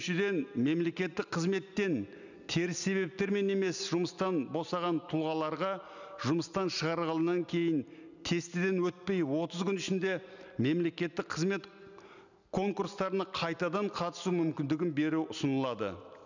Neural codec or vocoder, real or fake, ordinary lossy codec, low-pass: none; real; none; 7.2 kHz